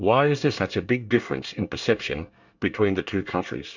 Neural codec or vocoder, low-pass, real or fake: codec, 24 kHz, 1 kbps, SNAC; 7.2 kHz; fake